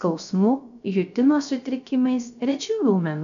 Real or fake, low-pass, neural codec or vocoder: fake; 7.2 kHz; codec, 16 kHz, 0.3 kbps, FocalCodec